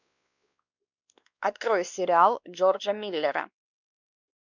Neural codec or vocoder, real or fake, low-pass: codec, 16 kHz, 2 kbps, X-Codec, WavLM features, trained on Multilingual LibriSpeech; fake; 7.2 kHz